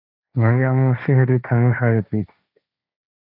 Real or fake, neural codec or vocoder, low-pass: fake; codec, 16 kHz, 1.1 kbps, Voila-Tokenizer; 5.4 kHz